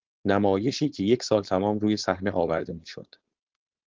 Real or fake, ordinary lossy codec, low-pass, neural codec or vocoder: fake; Opus, 16 kbps; 7.2 kHz; codec, 16 kHz, 4.8 kbps, FACodec